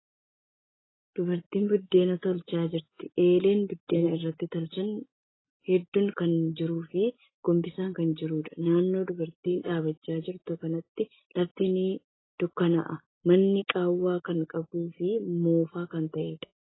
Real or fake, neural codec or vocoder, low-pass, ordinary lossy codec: real; none; 7.2 kHz; AAC, 16 kbps